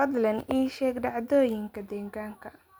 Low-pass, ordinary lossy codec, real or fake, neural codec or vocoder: none; none; real; none